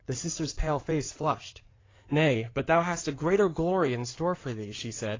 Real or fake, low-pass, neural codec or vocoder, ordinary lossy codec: fake; 7.2 kHz; codec, 16 kHz in and 24 kHz out, 2.2 kbps, FireRedTTS-2 codec; AAC, 32 kbps